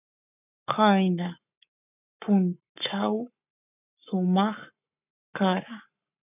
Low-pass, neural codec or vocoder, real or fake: 3.6 kHz; codec, 44.1 kHz, 7.8 kbps, Pupu-Codec; fake